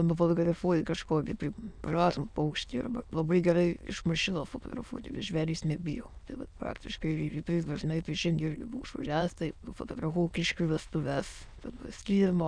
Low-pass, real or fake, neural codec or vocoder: 9.9 kHz; fake; autoencoder, 22.05 kHz, a latent of 192 numbers a frame, VITS, trained on many speakers